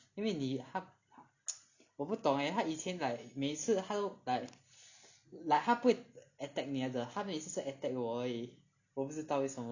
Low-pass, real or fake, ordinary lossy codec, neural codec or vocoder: 7.2 kHz; real; none; none